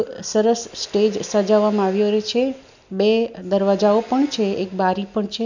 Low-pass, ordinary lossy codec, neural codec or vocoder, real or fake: 7.2 kHz; none; none; real